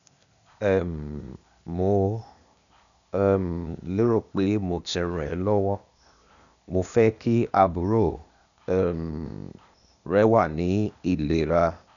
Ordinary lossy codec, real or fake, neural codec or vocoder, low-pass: none; fake; codec, 16 kHz, 0.8 kbps, ZipCodec; 7.2 kHz